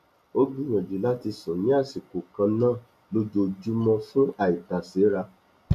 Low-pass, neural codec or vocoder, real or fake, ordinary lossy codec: 14.4 kHz; none; real; none